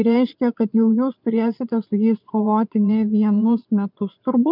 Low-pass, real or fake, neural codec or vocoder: 5.4 kHz; fake; vocoder, 22.05 kHz, 80 mel bands, Vocos